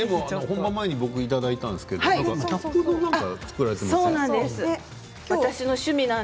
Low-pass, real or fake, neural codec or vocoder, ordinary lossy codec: none; real; none; none